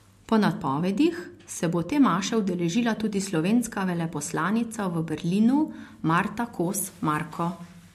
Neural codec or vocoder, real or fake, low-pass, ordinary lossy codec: none; real; 14.4 kHz; MP3, 64 kbps